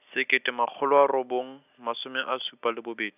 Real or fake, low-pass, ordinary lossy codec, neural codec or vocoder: real; 3.6 kHz; none; none